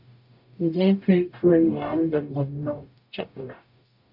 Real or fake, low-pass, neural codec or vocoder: fake; 5.4 kHz; codec, 44.1 kHz, 0.9 kbps, DAC